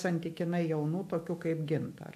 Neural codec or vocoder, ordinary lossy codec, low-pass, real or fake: autoencoder, 48 kHz, 128 numbers a frame, DAC-VAE, trained on Japanese speech; MP3, 64 kbps; 14.4 kHz; fake